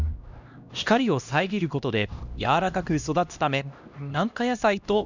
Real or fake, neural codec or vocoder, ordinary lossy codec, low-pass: fake; codec, 16 kHz, 1 kbps, X-Codec, HuBERT features, trained on LibriSpeech; none; 7.2 kHz